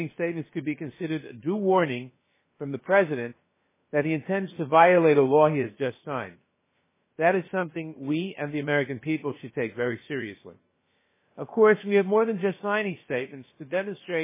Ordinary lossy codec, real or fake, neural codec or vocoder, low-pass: MP3, 16 kbps; fake; codec, 16 kHz, about 1 kbps, DyCAST, with the encoder's durations; 3.6 kHz